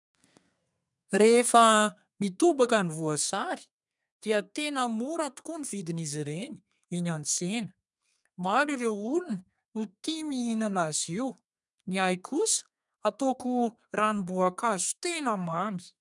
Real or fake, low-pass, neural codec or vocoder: fake; 10.8 kHz; codec, 32 kHz, 1.9 kbps, SNAC